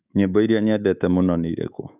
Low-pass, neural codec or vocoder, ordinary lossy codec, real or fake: 3.6 kHz; codec, 24 kHz, 3.1 kbps, DualCodec; none; fake